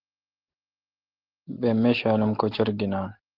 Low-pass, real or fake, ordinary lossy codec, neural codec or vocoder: 5.4 kHz; real; Opus, 16 kbps; none